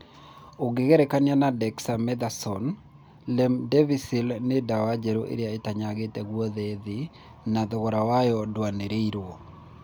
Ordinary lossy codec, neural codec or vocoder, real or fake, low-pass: none; none; real; none